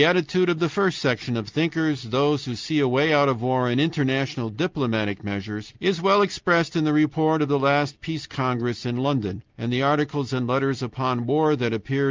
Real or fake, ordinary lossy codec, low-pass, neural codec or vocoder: real; Opus, 24 kbps; 7.2 kHz; none